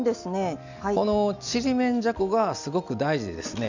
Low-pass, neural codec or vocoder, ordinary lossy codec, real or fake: 7.2 kHz; none; none; real